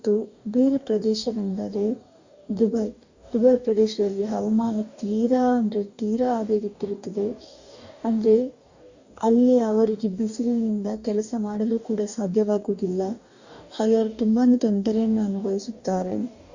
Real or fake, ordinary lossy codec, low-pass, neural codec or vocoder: fake; Opus, 64 kbps; 7.2 kHz; codec, 44.1 kHz, 2.6 kbps, DAC